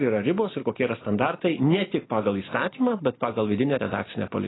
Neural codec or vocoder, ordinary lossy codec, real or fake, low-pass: none; AAC, 16 kbps; real; 7.2 kHz